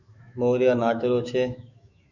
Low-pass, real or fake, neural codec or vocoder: 7.2 kHz; fake; autoencoder, 48 kHz, 128 numbers a frame, DAC-VAE, trained on Japanese speech